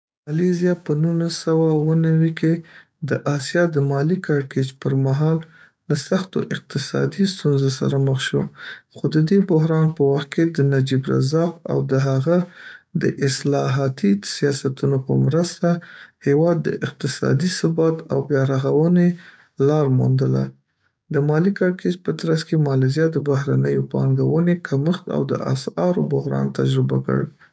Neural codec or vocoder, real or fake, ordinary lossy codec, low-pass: codec, 16 kHz, 6 kbps, DAC; fake; none; none